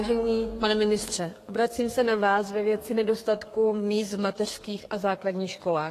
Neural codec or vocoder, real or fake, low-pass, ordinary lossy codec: codec, 32 kHz, 1.9 kbps, SNAC; fake; 14.4 kHz; AAC, 48 kbps